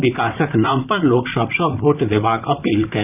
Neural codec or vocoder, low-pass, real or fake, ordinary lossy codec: vocoder, 44.1 kHz, 128 mel bands, Pupu-Vocoder; 3.6 kHz; fake; none